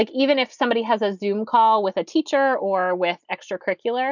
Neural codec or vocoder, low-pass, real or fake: none; 7.2 kHz; real